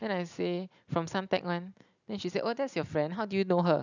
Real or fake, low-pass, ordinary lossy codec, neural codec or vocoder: real; 7.2 kHz; none; none